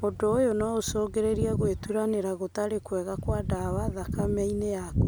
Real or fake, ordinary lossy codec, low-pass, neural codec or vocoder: real; none; none; none